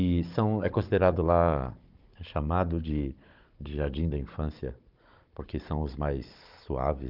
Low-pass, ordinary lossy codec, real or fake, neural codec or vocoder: 5.4 kHz; Opus, 24 kbps; fake; codec, 16 kHz, 16 kbps, FunCodec, trained on Chinese and English, 50 frames a second